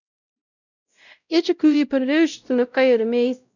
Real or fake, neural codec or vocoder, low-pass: fake; codec, 16 kHz, 0.5 kbps, X-Codec, WavLM features, trained on Multilingual LibriSpeech; 7.2 kHz